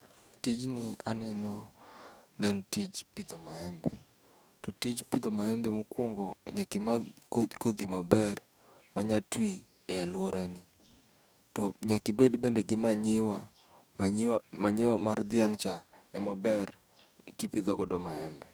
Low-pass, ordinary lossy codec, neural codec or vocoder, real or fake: none; none; codec, 44.1 kHz, 2.6 kbps, DAC; fake